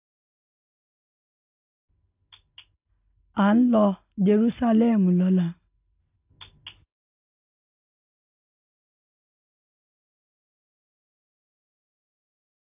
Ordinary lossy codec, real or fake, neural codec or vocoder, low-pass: AAC, 32 kbps; real; none; 3.6 kHz